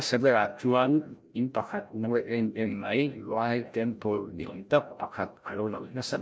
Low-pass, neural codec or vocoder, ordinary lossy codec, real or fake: none; codec, 16 kHz, 0.5 kbps, FreqCodec, larger model; none; fake